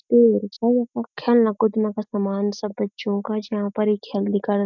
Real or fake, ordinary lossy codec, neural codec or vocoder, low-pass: real; MP3, 64 kbps; none; 7.2 kHz